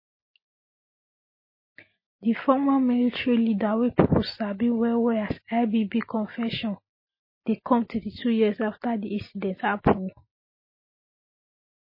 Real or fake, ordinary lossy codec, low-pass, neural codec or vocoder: real; MP3, 24 kbps; 5.4 kHz; none